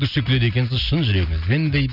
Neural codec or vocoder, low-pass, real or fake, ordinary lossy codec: none; 5.4 kHz; real; none